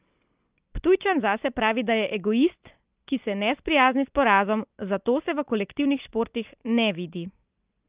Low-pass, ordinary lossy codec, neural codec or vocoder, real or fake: 3.6 kHz; Opus, 32 kbps; none; real